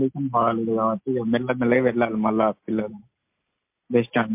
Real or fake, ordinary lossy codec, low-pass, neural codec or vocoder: real; MP3, 32 kbps; 3.6 kHz; none